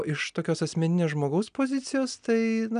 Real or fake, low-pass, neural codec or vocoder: real; 9.9 kHz; none